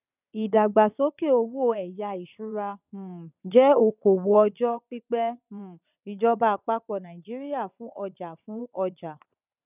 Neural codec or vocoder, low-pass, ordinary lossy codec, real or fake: vocoder, 22.05 kHz, 80 mel bands, WaveNeXt; 3.6 kHz; none; fake